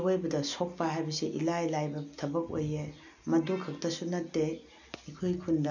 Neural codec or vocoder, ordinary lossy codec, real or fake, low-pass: none; none; real; 7.2 kHz